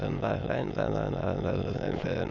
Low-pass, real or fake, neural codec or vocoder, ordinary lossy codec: 7.2 kHz; fake; autoencoder, 22.05 kHz, a latent of 192 numbers a frame, VITS, trained on many speakers; Opus, 64 kbps